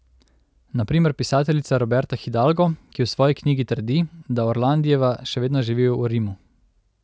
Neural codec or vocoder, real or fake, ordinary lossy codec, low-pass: none; real; none; none